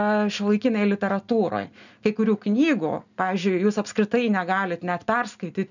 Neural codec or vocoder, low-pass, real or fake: none; 7.2 kHz; real